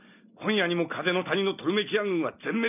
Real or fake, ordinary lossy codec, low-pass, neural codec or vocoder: real; none; 3.6 kHz; none